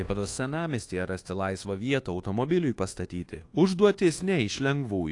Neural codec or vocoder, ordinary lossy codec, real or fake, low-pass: codec, 24 kHz, 1.2 kbps, DualCodec; AAC, 48 kbps; fake; 10.8 kHz